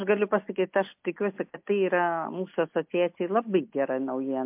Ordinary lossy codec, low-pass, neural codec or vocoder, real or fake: MP3, 32 kbps; 3.6 kHz; none; real